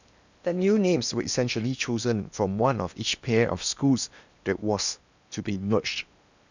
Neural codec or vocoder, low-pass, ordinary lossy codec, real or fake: codec, 16 kHz in and 24 kHz out, 0.8 kbps, FocalCodec, streaming, 65536 codes; 7.2 kHz; none; fake